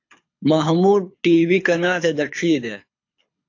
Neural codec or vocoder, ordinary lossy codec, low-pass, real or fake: codec, 24 kHz, 6 kbps, HILCodec; AAC, 48 kbps; 7.2 kHz; fake